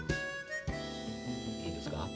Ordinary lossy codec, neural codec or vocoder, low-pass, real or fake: none; none; none; real